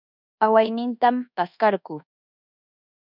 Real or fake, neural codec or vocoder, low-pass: fake; codec, 24 kHz, 1.2 kbps, DualCodec; 5.4 kHz